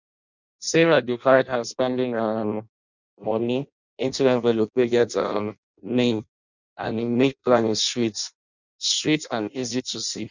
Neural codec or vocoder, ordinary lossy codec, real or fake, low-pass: codec, 16 kHz in and 24 kHz out, 0.6 kbps, FireRedTTS-2 codec; none; fake; 7.2 kHz